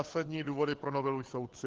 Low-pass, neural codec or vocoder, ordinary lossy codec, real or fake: 7.2 kHz; codec, 16 kHz, 4 kbps, FunCodec, trained on LibriTTS, 50 frames a second; Opus, 16 kbps; fake